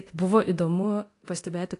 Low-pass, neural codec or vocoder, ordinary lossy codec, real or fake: 10.8 kHz; codec, 24 kHz, 0.9 kbps, DualCodec; AAC, 48 kbps; fake